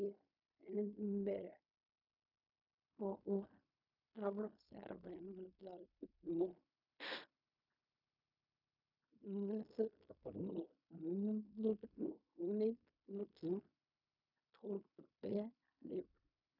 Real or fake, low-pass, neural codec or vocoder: fake; 5.4 kHz; codec, 16 kHz in and 24 kHz out, 0.4 kbps, LongCat-Audio-Codec, fine tuned four codebook decoder